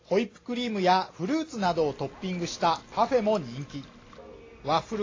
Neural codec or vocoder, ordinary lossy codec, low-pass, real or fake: none; AAC, 32 kbps; 7.2 kHz; real